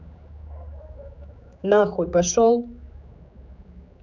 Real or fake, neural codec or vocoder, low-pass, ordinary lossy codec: fake; codec, 16 kHz, 4 kbps, X-Codec, HuBERT features, trained on general audio; 7.2 kHz; none